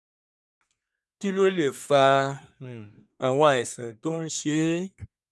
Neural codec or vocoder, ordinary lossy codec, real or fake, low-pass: codec, 24 kHz, 1 kbps, SNAC; none; fake; none